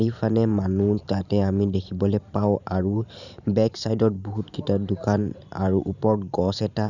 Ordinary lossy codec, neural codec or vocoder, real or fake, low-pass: none; vocoder, 44.1 kHz, 128 mel bands every 512 samples, BigVGAN v2; fake; 7.2 kHz